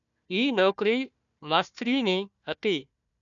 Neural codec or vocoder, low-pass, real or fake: codec, 16 kHz, 1 kbps, FunCodec, trained on Chinese and English, 50 frames a second; 7.2 kHz; fake